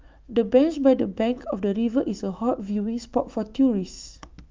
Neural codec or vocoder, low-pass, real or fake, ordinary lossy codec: autoencoder, 48 kHz, 128 numbers a frame, DAC-VAE, trained on Japanese speech; 7.2 kHz; fake; Opus, 24 kbps